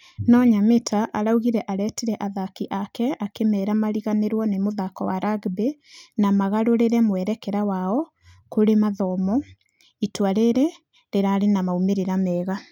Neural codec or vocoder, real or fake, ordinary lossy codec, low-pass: none; real; none; 19.8 kHz